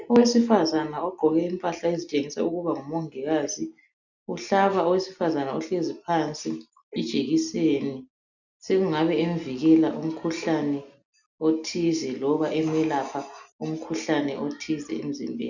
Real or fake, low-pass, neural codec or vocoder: real; 7.2 kHz; none